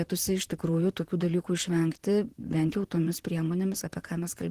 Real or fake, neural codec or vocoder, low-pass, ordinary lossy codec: fake; vocoder, 44.1 kHz, 128 mel bands, Pupu-Vocoder; 14.4 kHz; Opus, 16 kbps